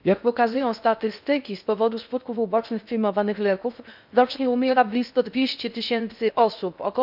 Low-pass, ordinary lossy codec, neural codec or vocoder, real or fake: 5.4 kHz; none; codec, 16 kHz in and 24 kHz out, 0.6 kbps, FocalCodec, streaming, 2048 codes; fake